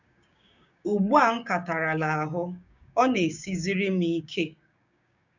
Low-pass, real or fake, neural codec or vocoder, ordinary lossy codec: 7.2 kHz; fake; codec, 16 kHz, 6 kbps, DAC; none